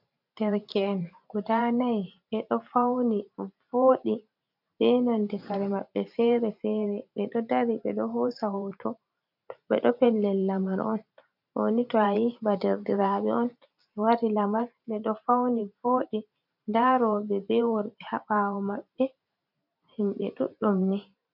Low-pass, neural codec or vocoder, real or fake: 5.4 kHz; vocoder, 44.1 kHz, 128 mel bands every 512 samples, BigVGAN v2; fake